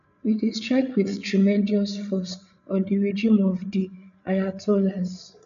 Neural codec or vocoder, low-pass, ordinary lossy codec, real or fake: codec, 16 kHz, 8 kbps, FreqCodec, larger model; 7.2 kHz; none; fake